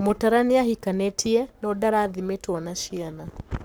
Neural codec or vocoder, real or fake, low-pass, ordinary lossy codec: codec, 44.1 kHz, 7.8 kbps, Pupu-Codec; fake; none; none